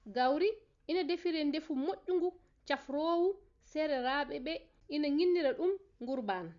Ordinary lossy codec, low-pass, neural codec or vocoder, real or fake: Opus, 64 kbps; 7.2 kHz; none; real